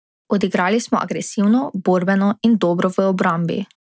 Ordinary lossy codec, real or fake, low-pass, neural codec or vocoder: none; real; none; none